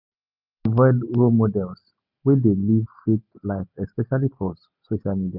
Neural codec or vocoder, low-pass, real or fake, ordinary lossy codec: none; 5.4 kHz; real; none